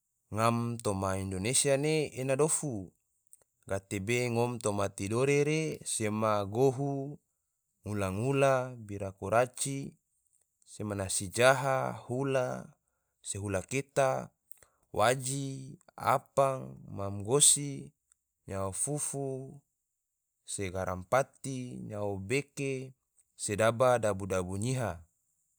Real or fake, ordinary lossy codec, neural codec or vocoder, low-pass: real; none; none; none